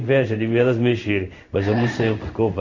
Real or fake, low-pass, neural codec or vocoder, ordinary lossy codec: fake; 7.2 kHz; codec, 16 kHz in and 24 kHz out, 1 kbps, XY-Tokenizer; none